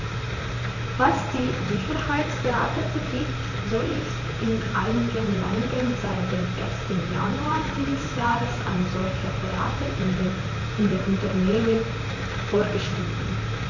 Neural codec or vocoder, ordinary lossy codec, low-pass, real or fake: vocoder, 44.1 kHz, 128 mel bands, Pupu-Vocoder; none; 7.2 kHz; fake